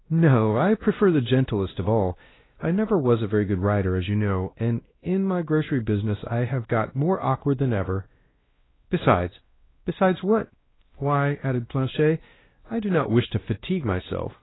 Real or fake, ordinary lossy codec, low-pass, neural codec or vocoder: fake; AAC, 16 kbps; 7.2 kHz; codec, 16 kHz, 1 kbps, X-Codec, WavLM features, trained on Multilingual LibriSpeech